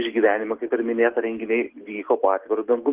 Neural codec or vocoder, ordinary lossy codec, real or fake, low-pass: none; Opus, 16 kbps; real; 3.6 kHz